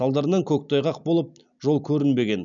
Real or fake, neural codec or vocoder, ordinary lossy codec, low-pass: real; none; none; 7.2 kHz